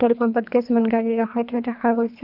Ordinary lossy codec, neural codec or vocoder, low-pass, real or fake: none; codec, 24 kHz, 3 kbps, HILCodec; 5.4 kHz; fake